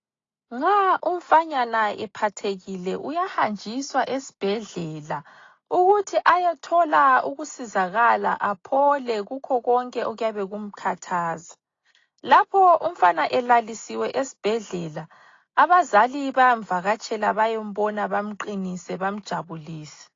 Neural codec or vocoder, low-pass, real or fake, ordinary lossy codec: none; 7.2 kHz; real; AAC, 32 kbps